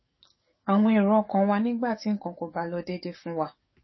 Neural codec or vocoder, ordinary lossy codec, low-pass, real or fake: codec, 44.1 kHz, 7.8 kbps, DAC; MP3, 24 kbps; 7.2 kHz; fake